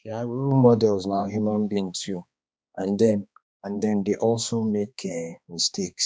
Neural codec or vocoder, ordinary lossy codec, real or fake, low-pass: codec, 16 kHz, 2 kbps, X-Codec, HuBERT features, trained on balanced general audio; none; fake; none